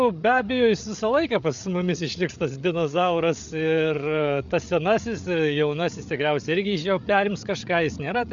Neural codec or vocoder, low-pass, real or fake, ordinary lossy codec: codec, 16 kHz, 8 kbps, FreqCodec, larger model; 7.2 kHz; fake; MP3, 64 kbps